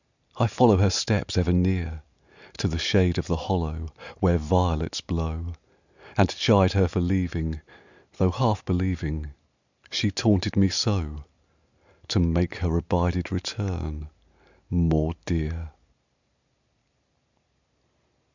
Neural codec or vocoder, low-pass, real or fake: none; 7.2 kHz; real